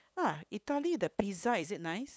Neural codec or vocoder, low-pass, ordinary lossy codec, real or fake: codec, 16 kHz, 8 kbps, FunCodec, trained on LibriTTS, 25 frames a second; none; none; fake